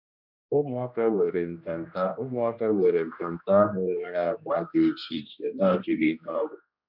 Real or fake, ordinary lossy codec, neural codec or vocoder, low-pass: fake; none; codec, 16 kHz, 1 kbps, X-Codec, HuBERT features, trained on general audio; 5.4 kHz